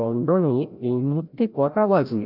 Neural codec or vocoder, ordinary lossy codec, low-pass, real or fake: codec, 16 kHz, 0.5 kbps, FreqCodec, larger model; none; 5.4 kHz; fake